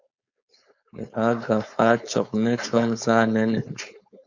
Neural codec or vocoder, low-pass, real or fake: codec, 16 kHz, 4.8 kbps, FACodec; 7.2 kHz; fake